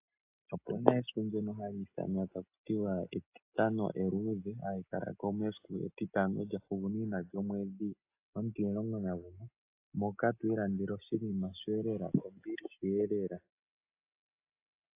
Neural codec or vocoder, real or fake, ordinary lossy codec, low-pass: none; real; AAC, 32 kbps; 3.6 kHz